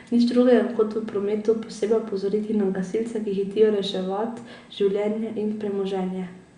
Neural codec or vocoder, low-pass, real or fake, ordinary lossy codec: none; 9.9 kHz; real; Opus, 64 kbps